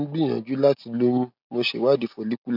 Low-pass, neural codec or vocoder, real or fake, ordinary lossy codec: 5.4 kHz; none; real; none